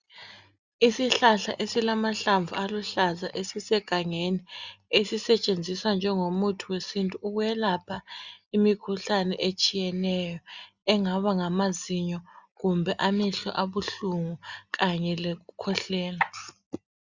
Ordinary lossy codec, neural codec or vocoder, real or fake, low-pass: Opus, 64 kbps; none; real; 7.2 kHz